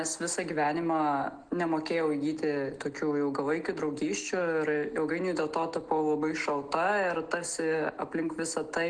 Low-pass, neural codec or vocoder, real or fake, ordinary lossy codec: 9.9 kHz; none; real; Opus, 16 kbps